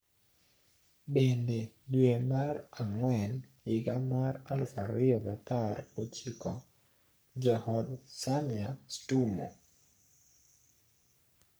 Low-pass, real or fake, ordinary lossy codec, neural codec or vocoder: none; fake; none; codec, 44.1 kHz, 3.4 kbps, Pupu-Codec